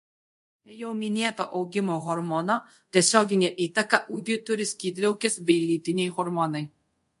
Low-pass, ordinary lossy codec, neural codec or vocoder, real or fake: 10.8 kHz; MP3, 48 kbps; codec, 24 kHz, 0.5 kbps, DualCodec; fake